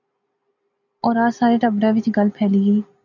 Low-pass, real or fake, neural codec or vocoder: 7.2 kHz; real; none